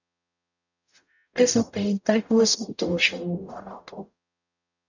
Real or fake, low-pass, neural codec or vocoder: fake; 7.2 kHz; codec, 44.1 kHz, 0.9 kbps, DAC